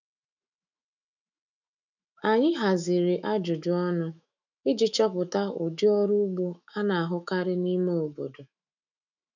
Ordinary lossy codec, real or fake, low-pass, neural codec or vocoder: none; fake; 7.2 kHz; autoencoder, 48 kHz, 128 numbers a frame, DAC-VAE, trained on Japanese speech